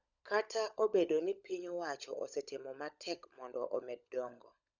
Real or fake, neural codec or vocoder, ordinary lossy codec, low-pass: fake; codec, 16 kHz, 16 kbps, FunCodec, trained on LibriTTS, 50 frames a second; none; 7.2 kHz